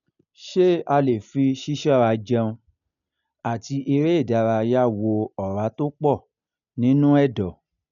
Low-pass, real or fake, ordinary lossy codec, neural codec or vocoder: 7.2 kHz; real; none; none